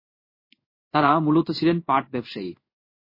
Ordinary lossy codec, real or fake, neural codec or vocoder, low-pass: MP3, 24 kbps; fake; codec, 16 kHz in and 24 kHz out, 1 kbps, XY-Tokenizer; 5.4 kHz